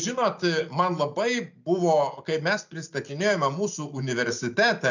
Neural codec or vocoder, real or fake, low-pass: none; real; 7.2 kHz